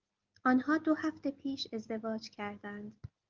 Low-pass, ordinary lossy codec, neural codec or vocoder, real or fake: 7.2 kHz; Opus, 32 kbps; none; real